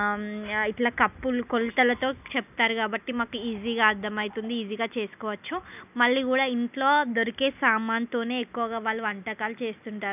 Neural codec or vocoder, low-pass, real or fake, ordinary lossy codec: none; 3.6 kHz; real; none